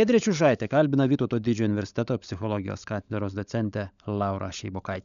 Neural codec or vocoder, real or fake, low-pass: codec, 16 kHz, 16 kbps, FunCodec, trained on LibriTTS, 50 frames a second; fake; 7.2 kHz